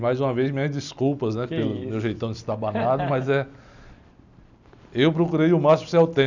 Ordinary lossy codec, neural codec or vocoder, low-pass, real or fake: none; none; 7.2 kHz; real